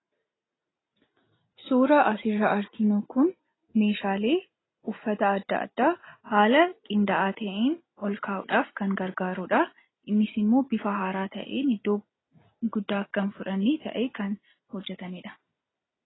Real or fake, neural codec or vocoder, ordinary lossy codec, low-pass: real; none; AAC, 16 kbps; 7.2 kHz